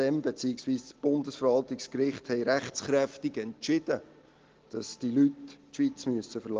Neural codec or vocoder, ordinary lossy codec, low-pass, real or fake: none; Opus, 16 kbps; 7.2 kHz; real